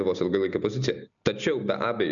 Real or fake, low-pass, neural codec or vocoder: real; 7.2 kHz; none